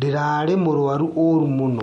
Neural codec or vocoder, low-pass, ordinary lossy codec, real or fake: none; 19.8 kHz; MP3, 48 kbps; real